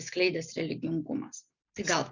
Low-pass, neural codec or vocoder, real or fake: 7.2 kHz; none; real